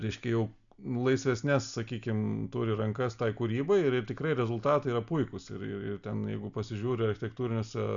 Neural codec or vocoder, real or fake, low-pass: none; real; 7.2 kHz